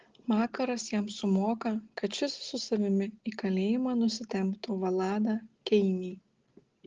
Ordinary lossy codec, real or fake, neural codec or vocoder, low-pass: Opus, 16 kbps; real; none; 7.2 kHz